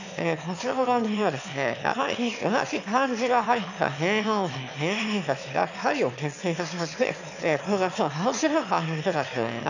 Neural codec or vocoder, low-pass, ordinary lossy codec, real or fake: autoencoder, 22.05 kHz, a latent of 192 numbers a frame, VITS, trained on one speaker; 7.2 kHz; none; fake